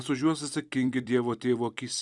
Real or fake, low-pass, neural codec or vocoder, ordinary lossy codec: fake; 10.8 kHz; vocoder, 44.1 kHz, 128 mel bands every 256 samples, BigVGAN v2; Opus, 64 kbps